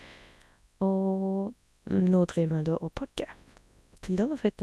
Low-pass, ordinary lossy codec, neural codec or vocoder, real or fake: none; none; codec, 24 kHz, 0.9 kbps, WavTokenizer, large speech release; fake